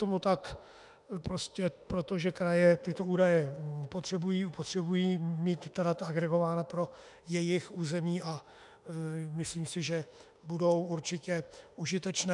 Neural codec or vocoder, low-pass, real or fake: autoencoder, 48 kHz, 32 numbers a frame, DAC-VAE, trained on Japanese speech; 10.8 kHz; fake